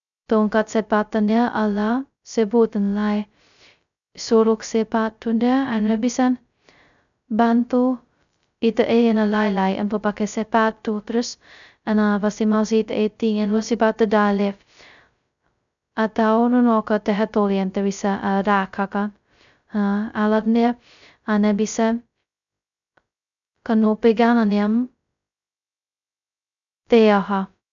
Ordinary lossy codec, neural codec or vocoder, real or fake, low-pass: none; codec, 16 kHz, 0.2 kbps, FocalCodec; fake; 7.2 kHz